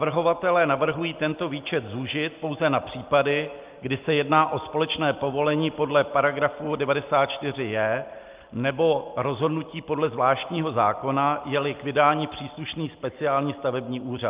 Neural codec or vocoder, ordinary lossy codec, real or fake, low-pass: none; Opus, 24 kbps; real; 3.6 kHz